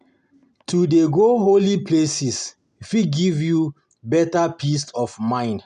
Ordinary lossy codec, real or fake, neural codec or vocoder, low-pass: none; real; none; 10.8 kHz